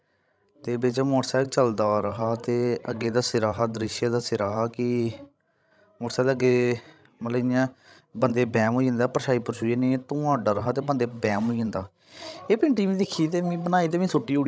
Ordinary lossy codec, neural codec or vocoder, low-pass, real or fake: none; codec, 16 kHz, 16 kbps, FreqCodec, larger model; none; fake